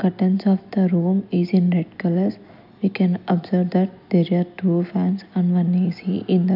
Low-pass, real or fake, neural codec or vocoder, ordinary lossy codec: 5.4 kHz; real; none; none